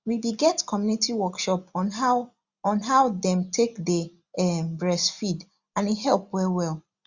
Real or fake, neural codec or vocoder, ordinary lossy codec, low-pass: real; none; Opus, 64 kbps; 7.2 kHz